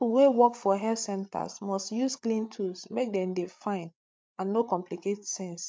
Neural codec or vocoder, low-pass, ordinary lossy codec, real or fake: codec, 16 kHz, 8 kbps, FreqCodec, larger model; none; none; fake